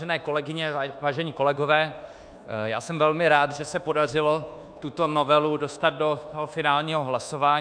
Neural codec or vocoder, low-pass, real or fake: codec, 24 kHz, 1.2 kbps, DualCodec; 9.9 kHz; fake